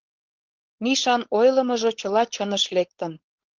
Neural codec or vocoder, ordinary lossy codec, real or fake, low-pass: codec, 16 kHz, 4.8 kbps, FACodec; Opus, 16 kbps; fake; 7.2 kHz